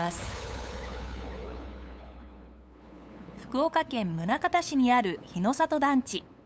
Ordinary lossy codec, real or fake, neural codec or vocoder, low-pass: none; fake; codec, 16 kHz, 8 kbps, FunCodec, trained on LibriTTS, 25 frames a second; none